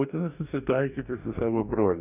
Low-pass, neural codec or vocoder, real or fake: 3.6 kHz; codec, 44.1 kHz, 2.6 kbps, DAC; fake